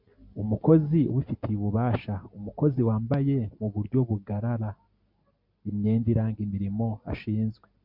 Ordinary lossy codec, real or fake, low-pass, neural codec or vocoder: MP3, 48 kbps; real; 5.4 kHz; none